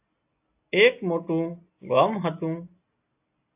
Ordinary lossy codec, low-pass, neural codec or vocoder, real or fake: AAC, 32 kbps; 3.6 kHz; none; real